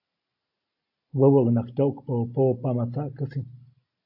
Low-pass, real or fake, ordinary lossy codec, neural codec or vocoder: 5.4 kHz; real; AAC, 48 kbps; none